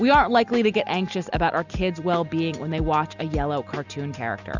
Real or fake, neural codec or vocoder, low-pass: real; none; 7.2 kHz